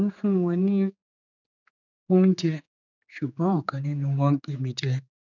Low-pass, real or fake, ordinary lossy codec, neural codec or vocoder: 7.2 kHz; fake; none; codec, 16 kHz, 4 kbps, X-Codec, HuBERT features, trained on general audio